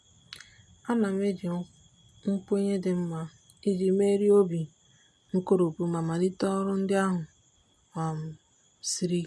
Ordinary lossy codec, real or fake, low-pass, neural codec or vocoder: none; real; none; none